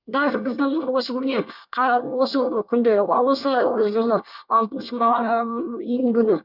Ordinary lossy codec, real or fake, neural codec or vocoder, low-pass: none; fake; codec, 24 kHz, 1 kbps, SNAC; 5.4 kHz